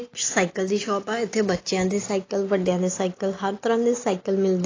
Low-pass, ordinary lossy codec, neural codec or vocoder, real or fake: 7.2 kHz; AAC, 32 kbps; none; real